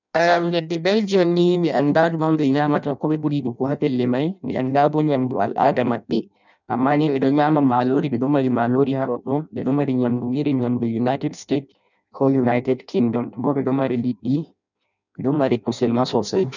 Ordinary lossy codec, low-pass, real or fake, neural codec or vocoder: none; 7.2 kHz; fake; codec, 16 kHz in and 24 kHz out, 0.6 kbps, FireRedTTS-2 codec